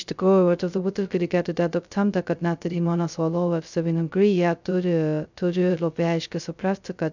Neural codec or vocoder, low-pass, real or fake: codec, 16 kHz, 0.2 kbps, FocalCodec; 7.2 kHz; fake